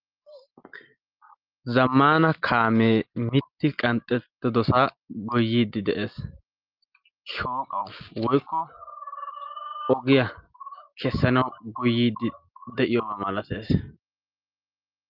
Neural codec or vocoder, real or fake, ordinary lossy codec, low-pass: none; real; Opus, 32 kbps; 5.4 kHz